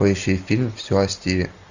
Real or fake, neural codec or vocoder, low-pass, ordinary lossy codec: real; none; 7.2 kHz; Opus, 64 kbps